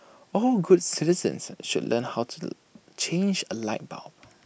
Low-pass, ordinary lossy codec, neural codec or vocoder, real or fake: none; none; none; real